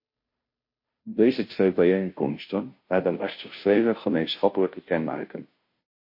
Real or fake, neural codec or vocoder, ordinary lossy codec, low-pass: fake; codec, 16 kHz, 0.5 kbps, FunCodec, trained on Chinese and English, 25 frames a second; MP3, 32 kbps; 5.4 kHz